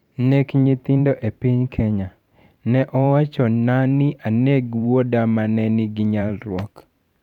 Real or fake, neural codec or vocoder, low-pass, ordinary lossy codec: fake; vocoder, 44.1 kHz, 128 mel bands every 512 samples, BigVGAN v2; 19.8 kHz; none